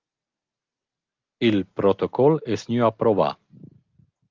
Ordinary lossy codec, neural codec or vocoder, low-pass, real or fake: Opus, 24 kbps; none; 7.2 kHz; real